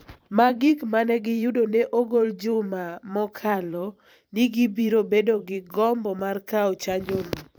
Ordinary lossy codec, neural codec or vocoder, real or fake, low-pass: none; vocoder, 44.1 kHz, 128 mel bands every 512 samples, BigVGAN v2; fake; none